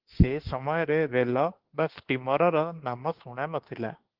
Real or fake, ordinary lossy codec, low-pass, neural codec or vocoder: fake; Opus, 16 kbps; 5.4 kHz; autoencoder, 48 kHz, 32 numbers a frame, DAC-VAE, trained on Japanese speech